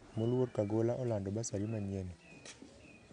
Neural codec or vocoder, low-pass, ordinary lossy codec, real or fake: none; 9.9 kHz; none; real